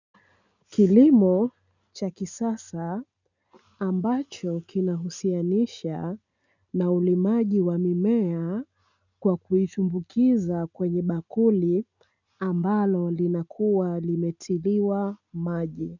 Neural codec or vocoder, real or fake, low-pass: none; real; 7.2 kHz